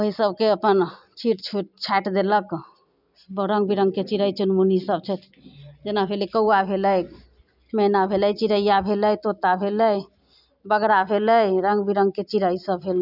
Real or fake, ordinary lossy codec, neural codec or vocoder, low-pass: real; none; none; 5.4 kHz